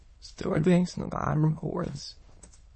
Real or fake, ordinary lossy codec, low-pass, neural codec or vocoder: fake; MP3, 32 kbps; 9.9 kHz; autoencoder, 22.05 kHz, a latent of 192 numbers a frame, VITS, trained on many speakers